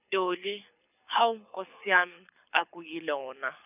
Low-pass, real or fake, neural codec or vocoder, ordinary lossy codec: 3.6 kHz; fake; codec, 24 kHz, 6 kbps, HILCodec; none